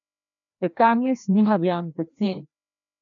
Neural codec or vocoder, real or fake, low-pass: codec, 16 kHz, 1 kbps, FreqCodec, larger model; fake; 7.2 kHz